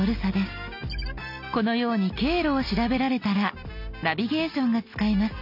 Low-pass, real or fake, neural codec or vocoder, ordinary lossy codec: 5.4 kHz; real; none; none